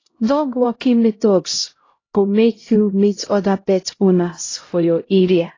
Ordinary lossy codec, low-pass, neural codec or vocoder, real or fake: AAC, 32 kbps; 7.2 kHz; codec, 16 kHz, 0.5 kbps, X-Codec, HuBERT features, trained on LibriSpeech; fake